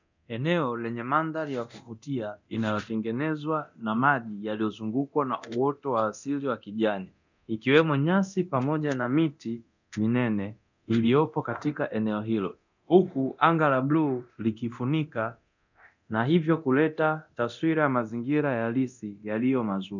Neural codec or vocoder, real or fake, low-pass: codec, 24 kHz, 0.9 kbps, DualCodec; fake; 7.2 kHz